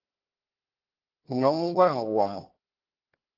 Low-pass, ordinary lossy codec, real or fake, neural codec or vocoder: 5.4 kHz; Opus, 16 kbps; fake; codec, 16 kHz, 1 kbps, FunCodec, trained on Chinese and English, 50 frames a second